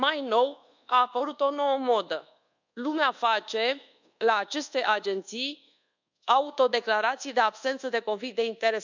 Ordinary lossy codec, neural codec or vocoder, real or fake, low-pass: none; codec, 24 kHz, 1.2 kbps, DualCodec; fake; 7.2 kHz